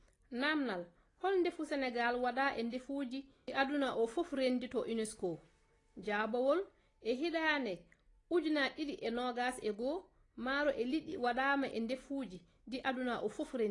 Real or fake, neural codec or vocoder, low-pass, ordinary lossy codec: real; none; 10.8 kHz; AAC, 32 kbps